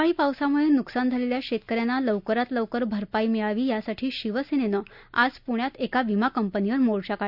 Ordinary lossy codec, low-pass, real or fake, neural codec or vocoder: none; 5.4 kHz; real; none